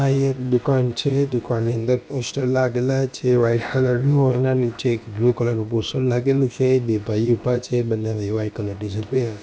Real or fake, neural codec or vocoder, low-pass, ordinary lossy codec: fake; codec, 16 kHz, about 1 kbps, DyCAST, with the encoder's durations; none; none